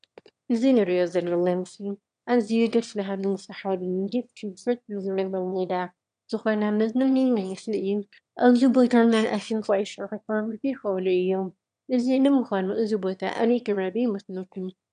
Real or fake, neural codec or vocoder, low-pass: fake; autoencoder, 22.05 kHz, a latent of 192 numbers a frame, VITS, trained on one speaker; 9.9 kHz